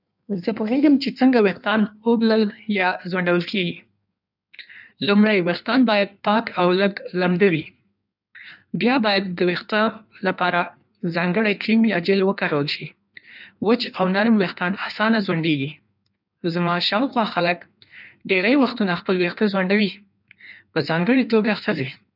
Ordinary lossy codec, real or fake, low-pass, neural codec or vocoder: none; fake; 5.4 kHz; codec, 16 kHz in and 24 kHz out, 1.1 kbps, FireRedTTS-2 codec